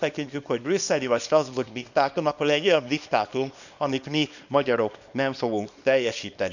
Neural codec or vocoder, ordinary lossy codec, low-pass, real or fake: codec, 24 kHz, 0.9 kbps, WavTokenizer, small release; none; 7.2 kHz; fake